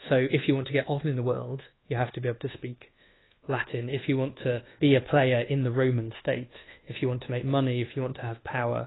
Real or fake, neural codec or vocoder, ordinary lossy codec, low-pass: fake; codec, 24 kHz, 1.2 kbps, DualCodec; AAC, 16 kbps; 7.2 kHz